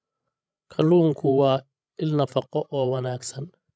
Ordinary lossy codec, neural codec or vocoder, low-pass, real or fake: none; codec, 16 kHz, 16 kbps, FreqCodec, larger model; none; fake